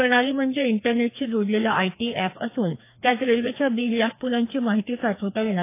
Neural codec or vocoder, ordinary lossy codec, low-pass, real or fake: codec, 16 kHz, 2 kbps, FreqCodec, larger model; AAC, 24 kbps; 3.6 kHz; fake